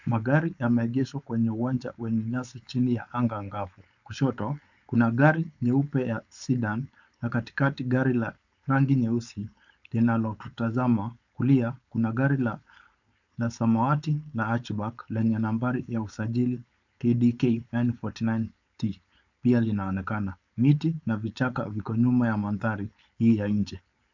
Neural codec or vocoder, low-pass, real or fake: codec, 16 kHz, 4.8 kbps, FACodec; 7.2 kHz; fake